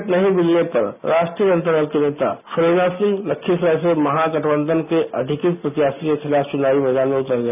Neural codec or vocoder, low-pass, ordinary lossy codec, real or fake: none; 3.6 kHz; none; real